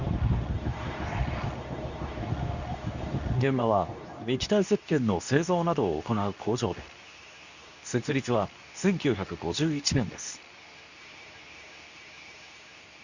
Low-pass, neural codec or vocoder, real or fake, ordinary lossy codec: 7.2 kHz; codec, 24 kHz, 0.9 kbps, WavTokenizer, medium speech release version 2; fake; none